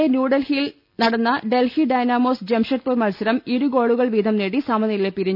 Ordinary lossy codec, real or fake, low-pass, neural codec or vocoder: none; real; 5.4 kHz; none